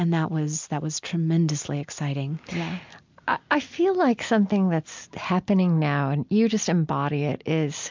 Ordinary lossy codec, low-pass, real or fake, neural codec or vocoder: MP3, 64 kbps; 7.2 kHz; real; none